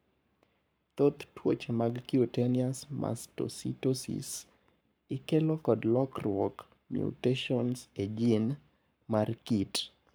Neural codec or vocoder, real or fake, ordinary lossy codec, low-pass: codec, 44.1 kHz, 7.8 kbps, Pupu-Codec; fake; none; none